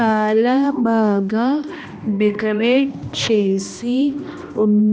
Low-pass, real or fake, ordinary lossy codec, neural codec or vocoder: none; fake; none; codec, 16 kHz, 1 kbps, X-Codec, HuBERT features, trained on balanced general audio